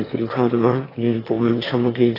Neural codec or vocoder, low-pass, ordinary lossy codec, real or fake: autoencoder, 22.05 kHz, a latent of 192 numbers a frame, VITS, trained on one speaker; 5.4 kHz; AAC, 24 kbps; fake